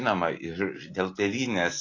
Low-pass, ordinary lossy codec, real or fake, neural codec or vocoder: 7.2 kHz; AAC, 32 kbps; real; none